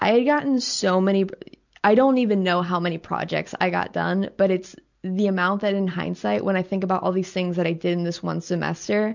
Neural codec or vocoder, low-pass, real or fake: none; 7.2 kHz; real